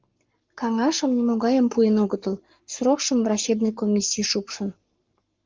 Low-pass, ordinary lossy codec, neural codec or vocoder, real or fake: 7.2 kHz; Opus, 24 kbps; codec, 44.1 kHz, 7.8 kbps, Pupu-Codec; fake